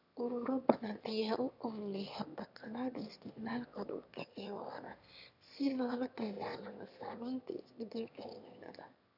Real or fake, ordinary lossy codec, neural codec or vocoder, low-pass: fake; none; autoencoder, 22.05 kHz, a latent of 192 numbers a frame, VITS, trained on one speaker; 5.4 kHz